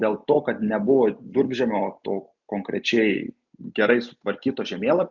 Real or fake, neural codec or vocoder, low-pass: real; none; 7.2 kHz